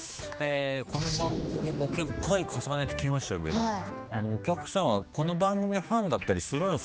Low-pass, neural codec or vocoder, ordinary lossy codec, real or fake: none; codec, 16 kHz, 2 kbps, X-Codec, HuBERT features, trained on general audio; none; fake